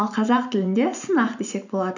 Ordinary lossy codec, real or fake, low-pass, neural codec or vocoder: none; fake; 7.2 kHz; vocoder, 44.1 kHz, 128 mel bands every 512 samples, BigVGAN v2